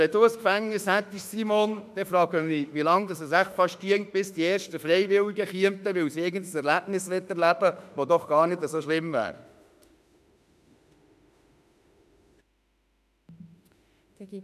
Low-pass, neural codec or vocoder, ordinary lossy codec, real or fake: 14.4 kHz; autoencoder, 48 kHz, 32 numbers a frame, DAC-VAE, trained on Japanese speech; none; fake